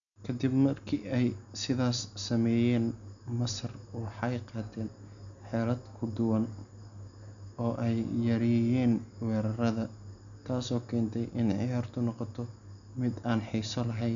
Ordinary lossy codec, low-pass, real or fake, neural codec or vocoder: none; 7.2 kHz; real; none